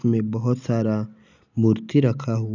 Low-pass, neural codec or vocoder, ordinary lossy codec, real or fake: 7.2 kHz; none; none; real